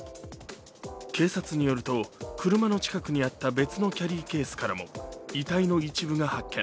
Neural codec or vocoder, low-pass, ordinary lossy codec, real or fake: none; none; none; real